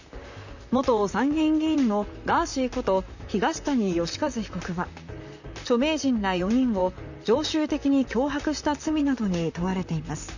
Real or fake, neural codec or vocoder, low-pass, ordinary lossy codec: fake; vocoder, 44.1 kHz, 128 mel bands, Pupu-Vocoder; 7.2 kHz; none